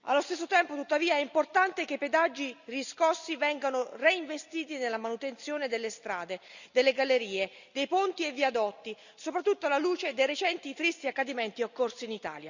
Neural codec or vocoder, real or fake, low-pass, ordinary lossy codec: none; real; 7.2 kHz; none